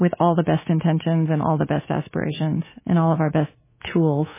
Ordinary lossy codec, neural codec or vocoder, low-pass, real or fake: MP3, 16 kbps; codec, 24 kHz, 3.1 kbps, DualCodec; 3.6 kHz; fake